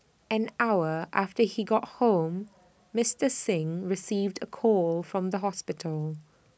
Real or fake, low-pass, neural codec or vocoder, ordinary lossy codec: real; none; none; none